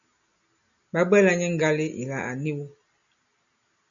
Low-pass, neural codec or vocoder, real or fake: 7.2 kHz; none; real